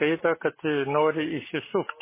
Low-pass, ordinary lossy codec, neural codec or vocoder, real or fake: 3.6 kHz; MP3, 16 kbps; none; real